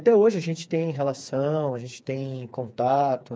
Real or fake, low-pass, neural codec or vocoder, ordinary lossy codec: fake; none; codec, 16 kHz, 4 kbps, FreqCodec, smaller model; none